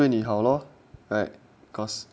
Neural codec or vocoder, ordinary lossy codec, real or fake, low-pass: none; none; real; none